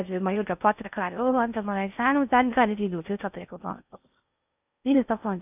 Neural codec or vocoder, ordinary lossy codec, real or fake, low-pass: codec, 16 kHz in and 24 kHz out, 0.6 kbps, FocalCodec, streaming, 4096 codes; none; fake; 3.6 kHz